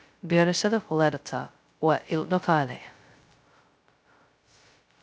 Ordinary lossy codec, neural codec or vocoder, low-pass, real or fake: none; codec, 16 kHz, 0.2 kbps, FocalCodec; none; fake